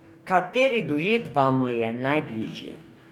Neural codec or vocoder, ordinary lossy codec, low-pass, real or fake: codec, 44.1 kHz, 2.6 kbps, DAC; none; 19.8 kHz; fake